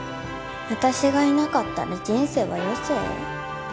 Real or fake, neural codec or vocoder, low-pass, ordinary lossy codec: real; none; none; none